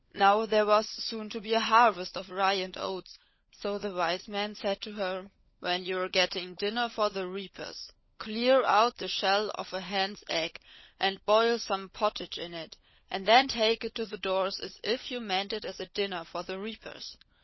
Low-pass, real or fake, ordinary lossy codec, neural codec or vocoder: 7.2 kHz; fake; MP3, 24 kbps; codec, 16 kHz, 16 kbps, FunCodec, trained on LibriTTS, 50 frames a second